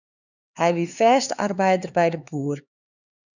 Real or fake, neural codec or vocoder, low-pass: fake; codec, 16 kHz, 4 kbps, X-Codec, HuBERT features, trained on LibriSpeech; 7.2 kHz